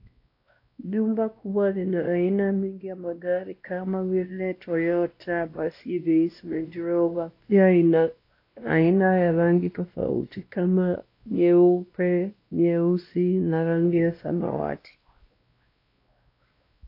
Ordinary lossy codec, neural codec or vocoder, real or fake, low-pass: AAC, 32 kbps; codec, 16 kHz, 1 kbps, X-Codec, WavLM features, trained on Multilingual LibriSpeech; fake; 5.4 kHz